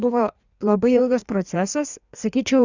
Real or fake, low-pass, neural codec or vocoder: fake; 7.2 kHz; codec, 16 kHz in and 24 kHz out, 1.1 kbps, FireRedTTS-2 codec